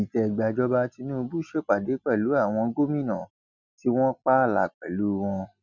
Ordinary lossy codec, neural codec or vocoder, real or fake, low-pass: none; none; real; 7.2 kHz